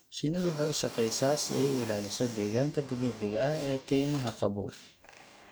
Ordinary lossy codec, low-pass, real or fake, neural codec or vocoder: none; none; fake; codec, 44.1 kHz, 2.6 kbps, DAC